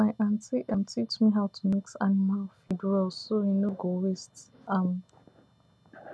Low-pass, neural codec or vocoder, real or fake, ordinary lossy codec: none; none; real; none